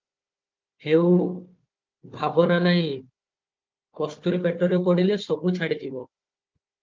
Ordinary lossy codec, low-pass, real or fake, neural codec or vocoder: Opus, 24 kbps; 7.2 kHz; fake; codec, 16 kHz, 4 kbps, FunCodec, trained on Chinese and English, 50 frames a second